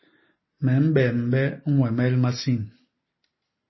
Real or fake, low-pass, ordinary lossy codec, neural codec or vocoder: real; 7.2 kHz; MP3, 24 kbps; none